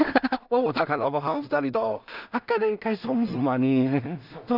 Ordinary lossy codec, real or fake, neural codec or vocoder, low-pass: none; fake; codec, 16 kHz in and 24 kHz out, 0.4 kbps, LongCat-Audio-Codec, two codebook decoder; 5.4 kHz